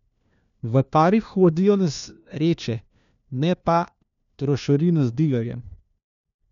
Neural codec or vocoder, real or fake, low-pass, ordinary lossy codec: codec, 16 kHz, 1 kbps, FunCodec, trained on LibriTTS, 50 frames a second; fake; 7.2 kHz; none